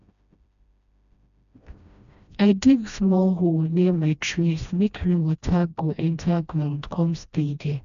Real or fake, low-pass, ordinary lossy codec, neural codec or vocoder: fake; 7.2 kHz; none; codec, 16 kHz, 1 kbps, FreqCodec, smaller model